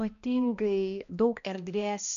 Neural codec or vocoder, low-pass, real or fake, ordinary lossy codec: codec, 16 kHz, 1 kbps, X-Codec, HuBERT features, trained on balanced general audio; 7.2 kHz; fake; MP3, 96 kbps